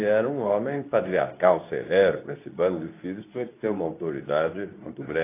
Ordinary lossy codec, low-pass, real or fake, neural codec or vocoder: AAC, 24 kbps; 3.6 kHz; fake; codec, 24 kHz, 0.9 kbps, WavTokenizer, medium speech release version 2